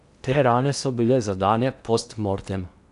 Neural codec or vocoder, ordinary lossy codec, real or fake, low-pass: codec, 16 kHz in and 24 kHz out, 0.8 kbps, FocalCodec, streaming, 65536 codes; none; fake; 10.8 kHz